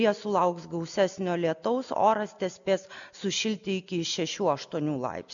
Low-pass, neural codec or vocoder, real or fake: 7.2 kHz; none; real